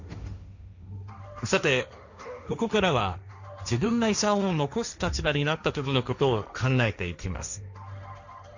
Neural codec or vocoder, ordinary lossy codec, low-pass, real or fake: codec, 16 kHz, 1.1 kbps, Voila-Tokenizer; none; 7.2 kHz; fake